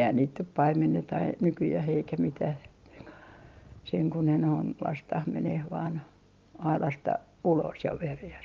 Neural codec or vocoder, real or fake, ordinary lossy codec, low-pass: none; real; Opus, 24 kbps; 7.2 kHz